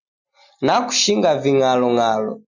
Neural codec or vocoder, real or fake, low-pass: none; real; 7.2 kHz